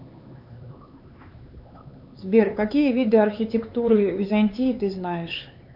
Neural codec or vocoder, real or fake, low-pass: codec, 16 kHz, 4 kbps, X-Codec, HuBERT features, trained on LibriSpeech; fake; 5.4 kHz